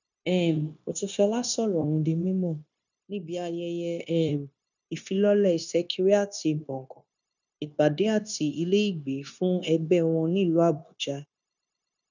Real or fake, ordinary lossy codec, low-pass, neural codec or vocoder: fake; none; 7.2 kHz; codec, 16 kHz, 0.9 kbps, LongCat-Audio-Codec